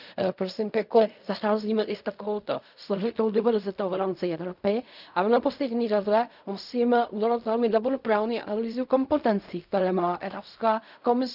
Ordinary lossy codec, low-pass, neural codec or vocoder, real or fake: none; 5.4 kHz; codec, 16 kHz in and 24 kHz out, 0.4 kbps, LongCat-Audio-Codec, fine tuned four codebook decoder; fake